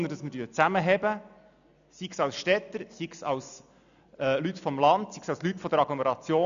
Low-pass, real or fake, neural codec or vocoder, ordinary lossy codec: 7.2 kHz; real; none; none